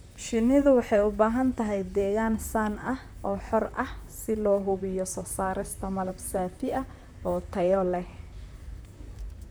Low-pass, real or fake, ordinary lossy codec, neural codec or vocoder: none; fake; none; vocoder, 44.1 kHz, 128 mel bands, Pupu-Vocoder